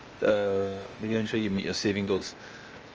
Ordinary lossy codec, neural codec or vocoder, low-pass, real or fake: Opus, 24 kbps; codec, 16 kHz, 0.8 kbps, ZipCodec; 7.2 kHz; fake